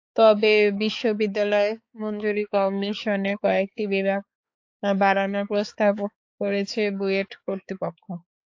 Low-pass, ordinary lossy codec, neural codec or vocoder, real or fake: 7.2 kHz; AAC, 48 kbps; codec, 16 kHz, 4 kbps, X-Codec, HuBERT features, trained on balanced general audio; fake